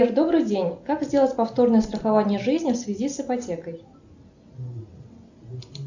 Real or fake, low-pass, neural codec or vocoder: real; 7.2 kHz; none